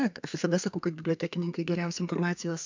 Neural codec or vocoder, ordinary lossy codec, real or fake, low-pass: codec, 32 kHz, 1.9 kbps, SNAC; MP3, 64 kbps; fake; 7.2 kHz